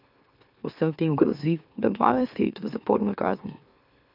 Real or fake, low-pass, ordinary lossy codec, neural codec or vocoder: fake; 5.4 kHz; none; autoencoder, 44.1 kHz, a latent of 192 numbers a frame, MeloTTS